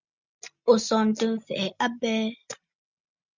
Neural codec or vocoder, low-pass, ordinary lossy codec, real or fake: none; 7.2 kHz; Opus, 64 kbps; real